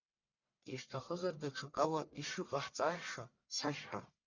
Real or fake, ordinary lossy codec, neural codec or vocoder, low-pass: fake; Opus, 64 kbps; codec, 44.1 kHz, 1.7 kbps, Pupu-Codec; 7.2 kHz